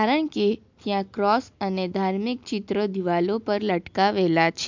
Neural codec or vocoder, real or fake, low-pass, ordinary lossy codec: none; real; 7.2 kHz; MP3, 64 kbps